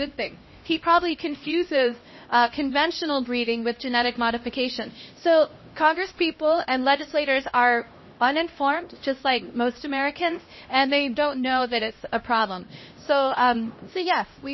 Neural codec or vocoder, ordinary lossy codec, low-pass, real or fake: codec, 16 kHz, 1 kbps, X-Codec, HuBERT features, trained on LibriSpeech; MP3, 24 kbps; 7.2 kHz; fake